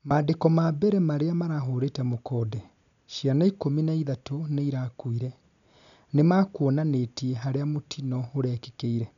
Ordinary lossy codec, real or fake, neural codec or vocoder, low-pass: none; real; none; 7.2 kHz